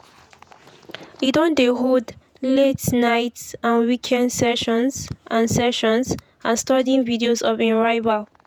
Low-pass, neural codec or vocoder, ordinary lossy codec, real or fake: none; vocoder, 48 kHz, 128 mel bands, Vocos; none; fake